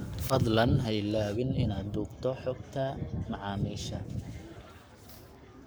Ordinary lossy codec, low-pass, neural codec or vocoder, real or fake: none; none; codec, 44.1 kHz, 7.8 kbps, Pupu-Codec; fake